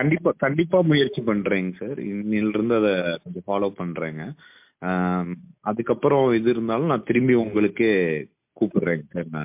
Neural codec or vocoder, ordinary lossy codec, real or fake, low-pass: none; MP3, 32 kbps; real; 3.6 kHz